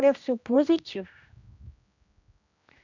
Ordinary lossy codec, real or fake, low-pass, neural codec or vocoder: none; fake; 7.2 kHz; codec, 16 kHz, 1 kbps, X-Codec, HuBERT features, trained on general audio